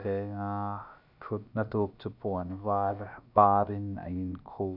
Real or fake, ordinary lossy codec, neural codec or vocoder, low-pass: fake; none; codec, 16 kHz, about 1 kbps, DyCAST, with the encoder's durations; 5.4 kHz